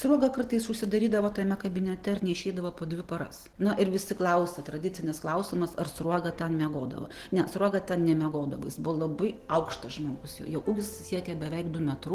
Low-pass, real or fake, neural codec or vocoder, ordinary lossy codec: 14.4 kHz; real; none; Opus, 16 kbps